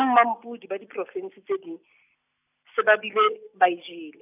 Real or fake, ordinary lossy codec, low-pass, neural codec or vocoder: real; none; 3.6 kHz; none